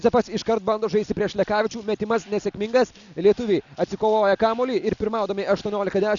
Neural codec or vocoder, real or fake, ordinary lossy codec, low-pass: none; real; MP3, 96 kbps; 7.2 kHz